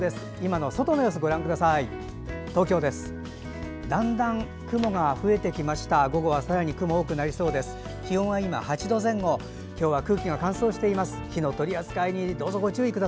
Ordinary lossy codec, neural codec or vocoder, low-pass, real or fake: none; none; none; real